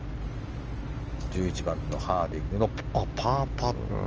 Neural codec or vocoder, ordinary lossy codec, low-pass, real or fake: none; Opus, 24 kbps; 7.2 kHz; real